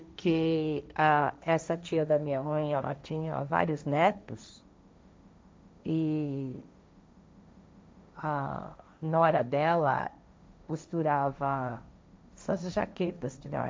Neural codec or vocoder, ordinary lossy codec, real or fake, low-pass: codec, 16 kHz, 1.1 kbps, Voila-Tokenizer; none; fake; none